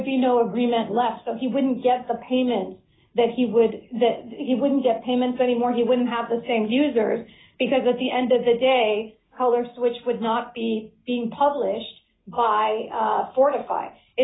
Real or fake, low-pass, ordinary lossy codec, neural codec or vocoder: real; 7.2 kHz; AAC, 16 kbps; none